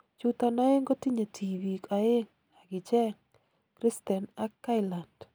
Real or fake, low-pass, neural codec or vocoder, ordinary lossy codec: real; none; none; none